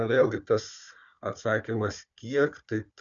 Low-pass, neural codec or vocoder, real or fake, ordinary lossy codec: 7.2 kHz; codec, 16 kHz, 4 kbps, FunCodec, trained on Chinese and English, 50 frames a second; fake; MP3, 96 kbps